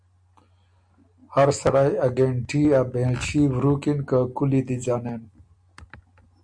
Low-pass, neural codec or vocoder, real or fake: 9.9 kHz; none; real